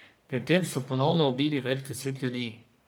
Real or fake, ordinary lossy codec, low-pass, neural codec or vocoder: fake; none; none; codec, 44.1 kHz, 1.7 kbps, Pupu-Codec